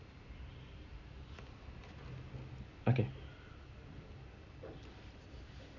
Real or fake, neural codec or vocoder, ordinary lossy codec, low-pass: real; none; none; 7.2 kHz